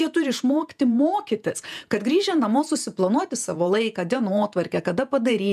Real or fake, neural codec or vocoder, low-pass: fake; vocoder, 48 kHz, 128 mel bands, Vocos; 14.4 kHz